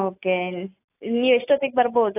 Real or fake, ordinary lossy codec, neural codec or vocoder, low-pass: real; AAC, 24 kbps; none; 3.6 kHz